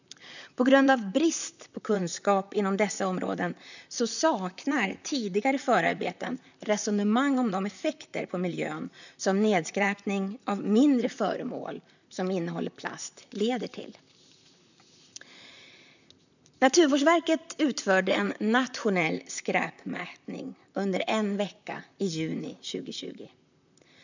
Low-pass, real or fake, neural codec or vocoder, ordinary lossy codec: 7.2 kHz; fake; vocoder, 44.1 kHz, 128 mel bands, Pupu-Vocoder; none